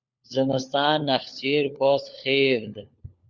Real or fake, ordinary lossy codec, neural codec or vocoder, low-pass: fake; Opus, 64 kbps; codec, 16 kHz, 4 kbps, FunCodec, trained on LibriTTS, 50 frames a second; 7.2 kHz